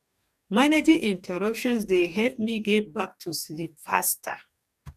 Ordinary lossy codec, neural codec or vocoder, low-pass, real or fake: none; codec, 44.1 kHz, 2.6 kbps, DAC; 14.4 kHz; fake